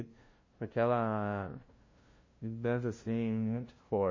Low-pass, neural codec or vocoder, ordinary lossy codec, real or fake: 7.2 kHz; codec, 16 kHz, 0.5 kbps, FunCodec, trained on LibriTTS, 25 frames a second; MP3, 32 kbps; fake